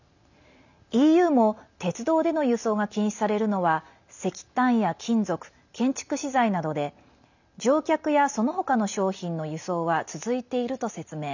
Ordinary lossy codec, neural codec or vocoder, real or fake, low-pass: none; none; real; 7.2 kHz